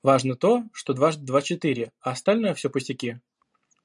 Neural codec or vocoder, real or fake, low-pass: none; real; 10.8 kHz